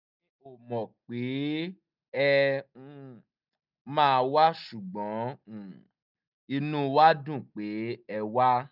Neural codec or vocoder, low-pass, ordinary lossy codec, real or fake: none; 5.4 kHz; none; real